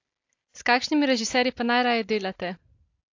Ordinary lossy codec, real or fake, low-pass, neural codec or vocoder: AAC, 48 kbps; real; 7.2 kHz; none